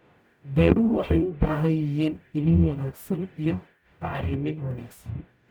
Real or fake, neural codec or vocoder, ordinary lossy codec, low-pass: fake; codec, 44.1 kHz, 0.9 kbps, DAC; none; none